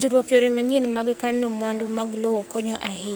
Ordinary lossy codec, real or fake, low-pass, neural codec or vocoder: none; fake; none; codec, 44.1 kHz, 2.6 kbps, SNAC